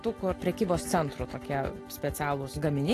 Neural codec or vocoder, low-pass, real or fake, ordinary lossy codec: none; 14.4 kHz; real; AAC, 48 kbps